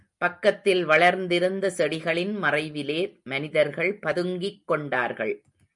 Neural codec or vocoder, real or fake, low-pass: none; real; 10.8 kHz